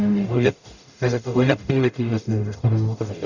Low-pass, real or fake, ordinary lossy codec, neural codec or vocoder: 7.2 kHz; fake; none; codec, 44.1 kHz, 0.9 kbps, DAC